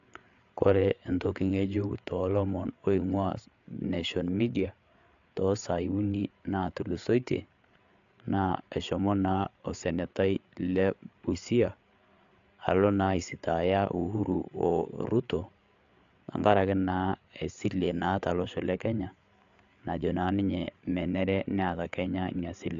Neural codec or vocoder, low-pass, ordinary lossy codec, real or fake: codec, 16 kHz, 8 kbps, FreqCodec, larger model; 7.2 kHz; AAC, 64 kbps; fake